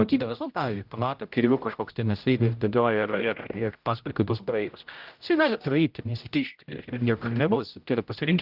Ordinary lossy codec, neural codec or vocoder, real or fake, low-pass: Opus, 24 kbps; codec, 16 kHz, 0.5 kbps, X-Codec, HuBERT features, trained on general audio; fake; 5.4 kHz